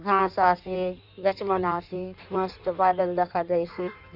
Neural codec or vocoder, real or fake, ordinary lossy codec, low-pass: codec, 16 kHz in and 24 kHz out, 1.1 kbps, FireRedTTS-2 codec; fake; none; 5.4 kHz